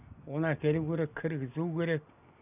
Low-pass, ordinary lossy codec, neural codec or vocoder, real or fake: 3.6 kHz; none; none; real